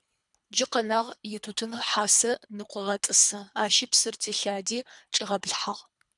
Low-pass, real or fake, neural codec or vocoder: 10.8 kHz; fake; codec, 24 kHz, 3 kbps, HILCodec